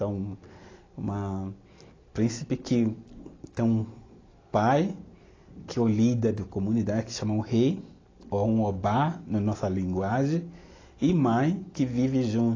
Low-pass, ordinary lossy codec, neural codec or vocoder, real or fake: 7.2 kHz; AAC, 32 kbps; none; real